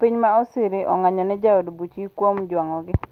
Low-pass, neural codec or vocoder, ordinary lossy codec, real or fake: 14.4 kHz; none; Opus, 24 kbps; real